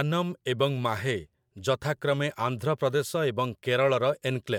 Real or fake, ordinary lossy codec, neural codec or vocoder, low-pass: fake; MP3, 96 kbps; vocoder, 44.1 kHz, 128 mel bands every 512 samples, BigVGAN v2; 19.8 kHz